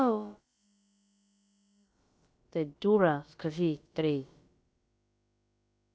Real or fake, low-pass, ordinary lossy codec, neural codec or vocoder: fake; none; none; codec, 16 kHz, about 1 kbps, DyCAST, with the encoder's durations